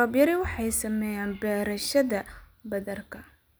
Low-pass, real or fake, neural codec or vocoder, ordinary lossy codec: none; real; none; none